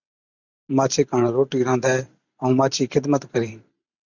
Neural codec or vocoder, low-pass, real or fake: vocoder, 44.1 kHz, 128 mel bands every 512 samples, BigVGAN v2; 7.2 kHz; fake